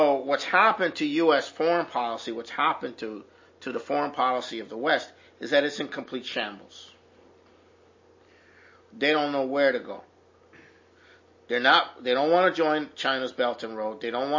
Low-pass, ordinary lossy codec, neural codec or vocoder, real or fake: 7.2 kHz; MP3, 32 kbps; none; real